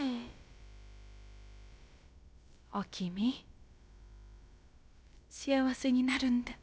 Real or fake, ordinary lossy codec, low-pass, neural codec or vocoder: fake; none; none; codec, 16 kHz, about 1 kbps, DyCAST, with the encoder's durations